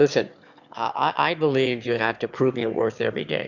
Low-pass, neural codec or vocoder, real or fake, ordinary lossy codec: 7.2 kHz; autoencoder, 22.05 kHz, a latent of 192 numbers a frame, VITS, trained on one speaker; fake; Opus, 64 kbps